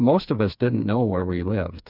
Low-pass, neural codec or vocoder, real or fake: 5.4 kHz; codec, 16 kHz, 4 kbps, FreqCodec, smaller model; fake